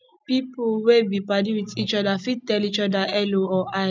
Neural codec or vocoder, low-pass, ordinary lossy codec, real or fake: none; none; none; real